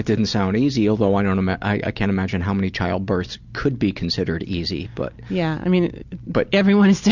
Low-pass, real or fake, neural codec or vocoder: 7.2 kHz; real; none